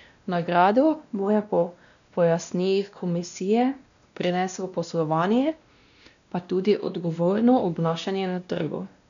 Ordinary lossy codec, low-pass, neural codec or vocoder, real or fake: MP3, 96 kbps; 7.2 kHz; codec, 16 kHz, 1 kbps, X-Codec, WavLM features, trained on Multilingual LibriSpeech; fake